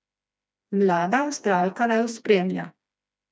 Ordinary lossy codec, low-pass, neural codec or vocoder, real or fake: none; none; codec, 16 kHz, 2 kbps, FreqCodec, smaller model; fake